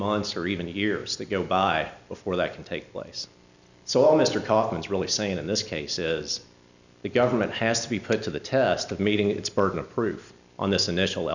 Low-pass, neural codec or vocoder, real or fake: 7.2 kHz; none; real